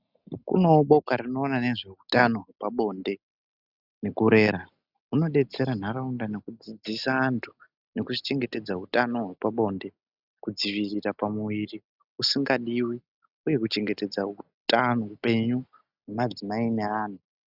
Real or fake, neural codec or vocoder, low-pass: real; none; 5.4 kHz